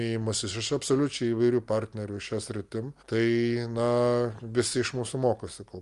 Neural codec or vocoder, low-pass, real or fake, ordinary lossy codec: none; 10.8 kHz; real; Opus, 32 kbps